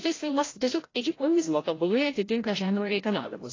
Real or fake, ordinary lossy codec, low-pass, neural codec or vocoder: fake; AAC, 32 kbps; 7.2 kHz; codec, 16 kHz, 0.5 kbps, FreqCodec, larger model